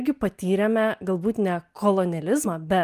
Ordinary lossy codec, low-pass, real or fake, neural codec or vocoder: Opus, 32 kbps; 14.4 kHz; real; none